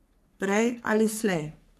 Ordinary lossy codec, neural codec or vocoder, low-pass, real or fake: none; codec, 44.1 kHz, 3.4 kbps, Pupu-Codec; 14.4 kHz; fake